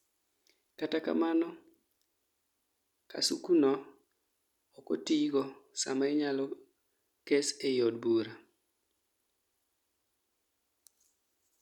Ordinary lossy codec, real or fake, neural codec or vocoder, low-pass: none; real; none; 19.8 kHz